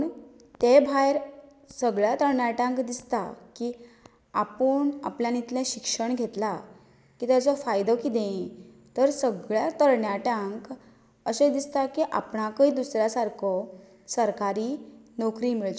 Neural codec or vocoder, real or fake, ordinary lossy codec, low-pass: none; real; none; none